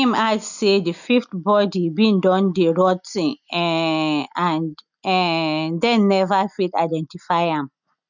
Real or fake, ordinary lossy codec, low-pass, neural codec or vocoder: real; none; 7.2 kHz; none